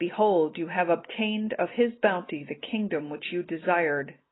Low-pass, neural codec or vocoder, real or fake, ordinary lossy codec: 7.2 kHz; none; real; AAC, 16 kbps